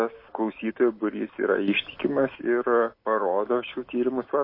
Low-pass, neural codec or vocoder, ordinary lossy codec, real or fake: 5.4 kHz; none; MP3, 24 kbps; real